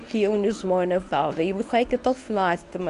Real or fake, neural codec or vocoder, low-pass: fake; codec, 24 kHz, 0.9 kbps, WavTokenizer, medium speech release version 1; 10.8 kHz